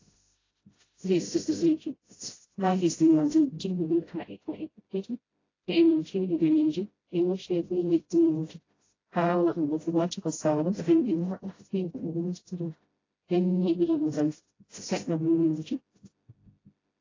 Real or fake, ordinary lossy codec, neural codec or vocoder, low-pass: fake; AAC, 32 kbps; codec, 16 kHz, 0.5 kbps, FreqCodec, smaller model; 7.2 kHz